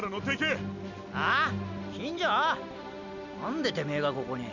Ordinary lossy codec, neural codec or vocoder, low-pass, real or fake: none; none; 7.2 kHz; real